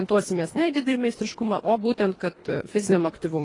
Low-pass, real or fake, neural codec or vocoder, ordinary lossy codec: 10.8 kHz; fake; codec, 24 kHz, 1.5 kbps, HILCodec; AAC, 32 kbps